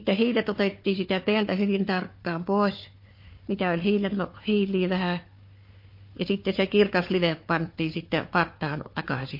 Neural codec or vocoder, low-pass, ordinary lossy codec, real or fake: codec, 16 kHz, 4 kbps, FunCodec, trained on Chinese and English, 50 frames a second; 5.4 kHz; MP3, 32 kbps; fake